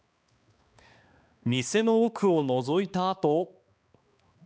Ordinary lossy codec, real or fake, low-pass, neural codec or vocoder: none; fake; none; codec, 16 kHz, 2 kbps, X-Codec, HuBERT features, trained on balanced general audio